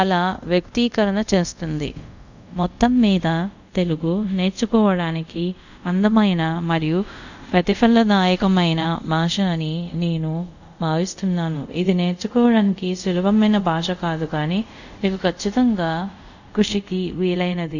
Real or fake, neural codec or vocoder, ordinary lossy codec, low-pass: fake; codec, 24 kHz, 0.5 kbps, DualCodec; none; 7.2 kHz